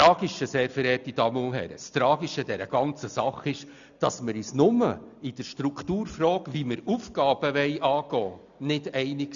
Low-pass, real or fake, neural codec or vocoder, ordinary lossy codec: 7.2 kHz; real; none; none